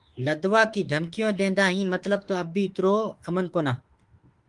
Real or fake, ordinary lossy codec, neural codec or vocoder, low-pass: fake; Opus, 24 kbps; autoencoder, 48 kHz, 32 numbers a frame, DAC-VAE, trained on Japanese speech; 10.8 kHz